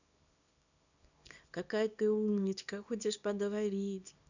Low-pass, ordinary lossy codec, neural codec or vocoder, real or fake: 7.2 kHz; none; codec, 24 kHz, 0.9 kbps, WavTokenizer, small release; fake